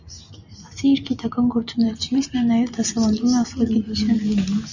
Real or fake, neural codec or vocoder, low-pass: real; none; 7.2 kHz